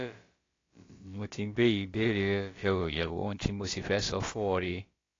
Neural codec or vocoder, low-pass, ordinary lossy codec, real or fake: codec, 16 kHz, about 1 kbps, DyCAST, with the encoder's durations; 7.2 kHz; AAC, 32 kbps; fake